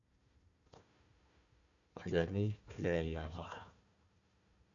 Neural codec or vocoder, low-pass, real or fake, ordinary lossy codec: codec, 16 kHz, 1 kbps, FunCodec, trained on Chinese and English, 50 frames a second; 7.2 kHz; fake; none